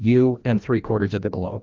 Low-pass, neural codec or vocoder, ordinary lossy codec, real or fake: 7.2 kHz; codec, 16 kHz, 1 kbps, FreqCodec, larger model; Opus, 24 kbps; fake